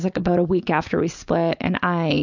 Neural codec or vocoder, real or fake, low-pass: none; real; 7.2 kHz